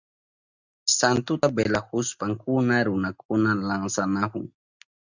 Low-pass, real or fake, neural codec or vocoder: 7.2 kHz; real; none